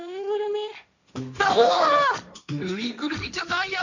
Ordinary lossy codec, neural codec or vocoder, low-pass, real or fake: none; codec, 16 kHz, 1.1 kbps, Voila-Tokenizer; 7.2 kHz; fake